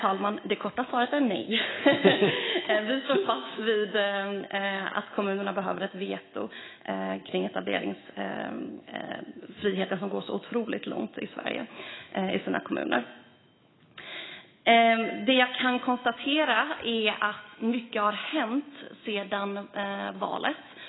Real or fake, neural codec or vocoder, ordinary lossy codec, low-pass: fake; autoencoder, 48 kHz, 128 numbers a frame, DAC-VAE, trained on Japanese speech; AAC, 16 kbps; 7.2 kHz